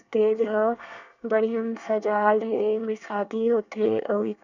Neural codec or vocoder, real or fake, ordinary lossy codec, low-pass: codec, 24 kHz, 1 kbps, SNAC; fake; none; 7.2 kHz